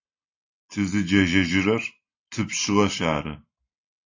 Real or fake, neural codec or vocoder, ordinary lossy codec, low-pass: real; none; AAC, 48 kbps; 7.2 kHz